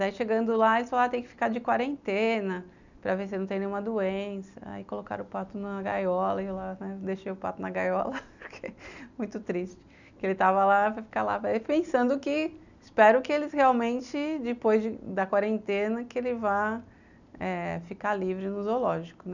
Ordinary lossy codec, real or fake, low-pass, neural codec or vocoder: none; real; 7.2 kHz; none